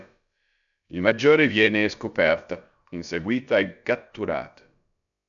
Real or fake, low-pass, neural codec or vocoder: fake; 7.2 kHz; codec, 16 kHz, about 1 kbps, DyCAST, with the encoder's durations